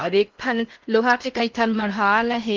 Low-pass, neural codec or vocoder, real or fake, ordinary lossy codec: 7.2 kHz; codec, 16 kHz in and 24 kHz out, 0.6 kbps, FocalCodec, streaming, 4096 codes; fake; Opus, 32 kbps